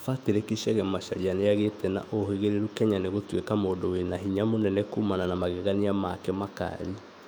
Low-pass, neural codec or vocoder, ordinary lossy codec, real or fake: 19.8 kHz; autoencoder, 48 kHz, 128 numbers a frame, DAC-VAE, trained on Japanese speech; none; fake